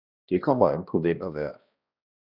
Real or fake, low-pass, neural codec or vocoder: fake; 5.4 kHz; codec, 16 kHz, 1 kbps, X-Codec, HuBERT features, trained on balanced general audio